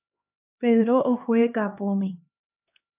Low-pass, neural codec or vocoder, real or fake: 3.6 kHz; codec, 16 kHz, 2 kbps, X-Codec, HuBERT features, trained on LibriSpeech; fake